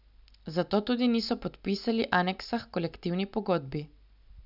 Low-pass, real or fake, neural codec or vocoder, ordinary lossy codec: 5.4 kHz; real; none; none